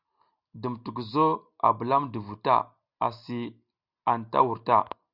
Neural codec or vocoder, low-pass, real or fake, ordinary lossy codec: none; 5.4 kHz; real; Opus, 64 kbps